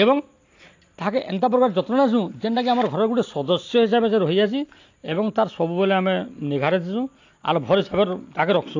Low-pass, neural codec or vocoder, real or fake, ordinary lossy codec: 7.2 kHz; none; real; AAC, 48 kbps